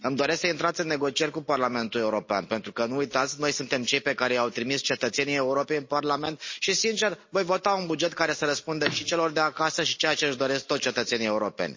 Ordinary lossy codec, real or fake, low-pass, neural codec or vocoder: MP3, 32 kbps; real; 7.2 kHz; none